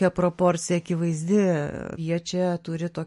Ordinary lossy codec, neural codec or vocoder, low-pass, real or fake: MP3, 48 kbps; none; 14.4 kHz; real